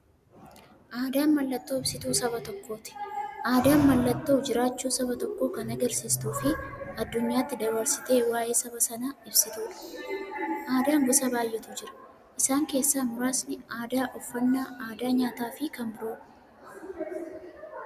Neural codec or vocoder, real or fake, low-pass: none; real; 14.4 kHz